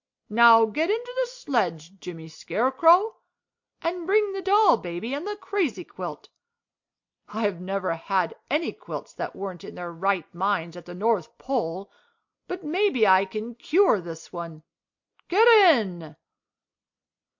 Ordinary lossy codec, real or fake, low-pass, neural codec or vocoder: MP3, 48 kbps; real; 7.2 kHz; none